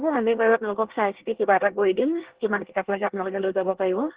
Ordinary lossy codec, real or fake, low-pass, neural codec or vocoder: Opus, 16 kbps; fake; 3.6 kHz; codec, 24 kHz, 1 kbps, SNAC